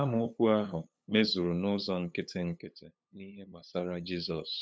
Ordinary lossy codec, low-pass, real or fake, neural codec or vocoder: none; none; fake; codec, 16 kHz, 8 kbps, FunCodec, trained on LibriTTS, 25 frames a second